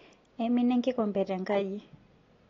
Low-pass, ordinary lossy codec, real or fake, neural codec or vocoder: 7.2 kHz; AAC, 32 kbps; real; none